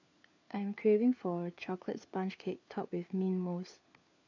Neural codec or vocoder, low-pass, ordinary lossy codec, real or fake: codec, 16 kHz, 4 kbps, FunCodec, trained on LibriTTS, 50 frames a second; 7.2 kHz; AAC, 48 kbps; fake